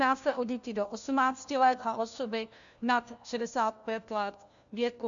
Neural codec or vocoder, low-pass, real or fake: codec, 16 kHz, 1 kbps, FunCodec, trained on LibriTTS, 50 frames a second; 7.2 kHz; fake